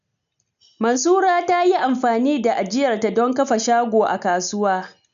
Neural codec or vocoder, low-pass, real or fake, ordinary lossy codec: none; 7.2 kHz; real; none